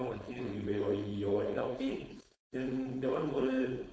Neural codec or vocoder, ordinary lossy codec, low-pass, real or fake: codec, 16 kHz, 4.8 kbps, FACodec; none; none; fake